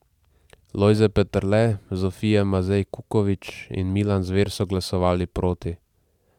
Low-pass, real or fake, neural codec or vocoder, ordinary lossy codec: 19.8 kHz; fake; vocoder, 44.1 kHz, 128 mel bands every 512 samples, BigVGAN v2; none